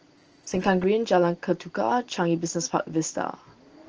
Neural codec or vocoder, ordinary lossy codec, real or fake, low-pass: none; Opus, 16 kbps; real; 7.2 kHz